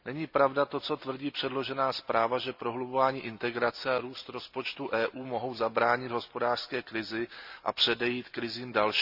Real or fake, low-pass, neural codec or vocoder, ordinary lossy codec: real; 5.4 kHz; none; none